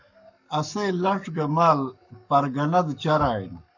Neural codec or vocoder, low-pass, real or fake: codec, 44.1 kHz, 7.8 kbps, Pupu-Codec; 7.2 kHz; fake